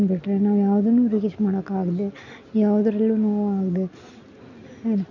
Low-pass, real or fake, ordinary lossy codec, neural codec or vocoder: 7.2 kHz; real; none; none